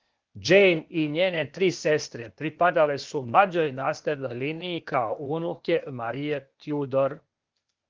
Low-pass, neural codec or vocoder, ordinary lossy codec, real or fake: 7.2 kHz; codec, 16 kHz, 0.8 kbps, ZipCodec; Opus, 32 kbps; fake